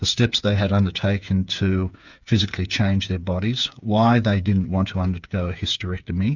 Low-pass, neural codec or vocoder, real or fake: 7.2 kHz; codec, 16 kHz, 8 kbps, FreqCodec, smaller model; fake